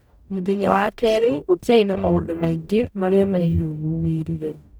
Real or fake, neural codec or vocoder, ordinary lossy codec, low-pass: fake; codec, 44.1 kHz, 0.9 kbps, DAC; none; none